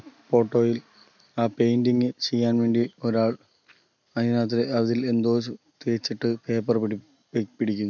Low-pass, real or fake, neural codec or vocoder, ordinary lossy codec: 7.2 kHz; real; none; none